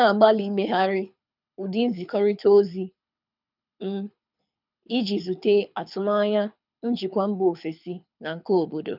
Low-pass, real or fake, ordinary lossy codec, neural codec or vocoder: 5.4 kHz; fake; none; codec, 24 kHz, 6 kbps, HILCodec